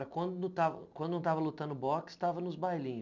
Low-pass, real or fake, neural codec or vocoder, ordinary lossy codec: 7.2 kHz; real; none; none